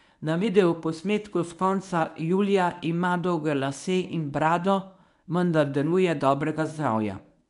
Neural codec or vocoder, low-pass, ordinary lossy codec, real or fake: codec, 24 kHz, 0.9 kbps, WavTokenizer, medium speech release version 1; 10.8 kHz; none; fake